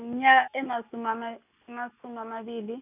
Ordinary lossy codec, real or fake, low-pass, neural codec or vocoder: none; real; 3.6 kHz; none